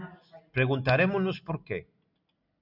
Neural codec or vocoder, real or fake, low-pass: none; real; 5.4 kHz